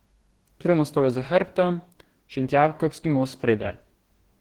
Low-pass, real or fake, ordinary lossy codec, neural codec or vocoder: 19.8 kHz; fake; Opus, 16 kbps; codec, 44.1 kHz, 2.6 kbps, DAC